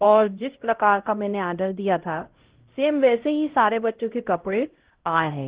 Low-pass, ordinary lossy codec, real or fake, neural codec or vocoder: 3.6 kHz; Opus, 16 kbps; fake; codec, 16 kHz, 0.5 kbps, X-Codec, HuBERT features, trained on LibriSpeech